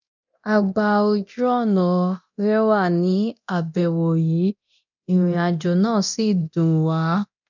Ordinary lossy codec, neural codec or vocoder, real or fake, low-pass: none; codec, 24 kHz, 0.9 kbps, DualCodec; fake; 7.2 kHz